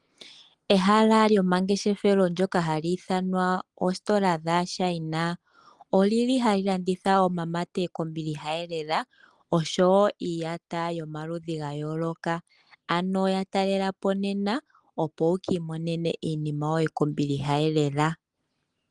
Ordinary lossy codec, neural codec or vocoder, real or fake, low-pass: Opus, 24 kbps; none; real; 10.8 kHz